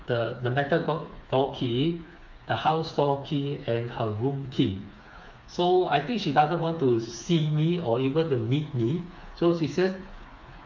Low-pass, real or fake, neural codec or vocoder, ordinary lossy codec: 7.2 kHz; fake; codec, 16 kHz, 4 kbps, FreqCodec, smaller model; MP3, 48 kbps